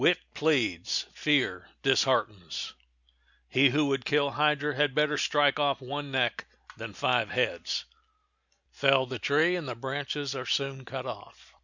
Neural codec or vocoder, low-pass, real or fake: none; 7.2 kHz; real